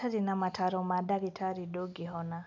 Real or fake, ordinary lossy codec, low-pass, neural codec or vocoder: real; none; none; none